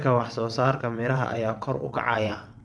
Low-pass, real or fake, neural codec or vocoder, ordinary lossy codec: none; fake; vocoder, 22.05 kHz, 80 mel bands, WaveNeXt; none